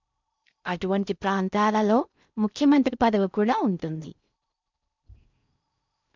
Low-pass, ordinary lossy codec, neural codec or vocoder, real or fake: 7.2 kHz; none; codec, 16 kHz in and 24 kHz out, 0.6 kbps, FocalCodec, streaming, 2048 codes; fake